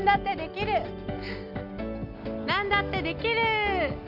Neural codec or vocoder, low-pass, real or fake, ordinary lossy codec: none; 5.4 kHz; real; MP3, 48 kbps